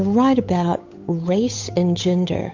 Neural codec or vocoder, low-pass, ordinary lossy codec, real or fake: codec, 16 kHz, 8 kbps, FunCodec, trained on Chinese and English, 25 frames a second; 7.2 kHz; MP3, 48 kbps; fake